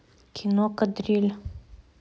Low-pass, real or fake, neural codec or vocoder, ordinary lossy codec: none; real; none; none